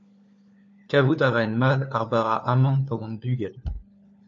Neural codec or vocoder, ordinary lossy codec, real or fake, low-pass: codec, 16 kHz, 4 kbps, FunCodec, trained on LibriTTS, 50 frames a second; MP3, 48 kbps; fake; 7.2 kHz